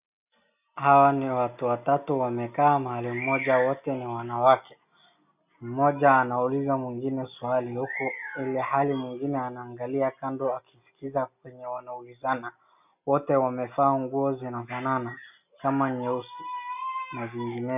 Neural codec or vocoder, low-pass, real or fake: none; 3.6 kHz; real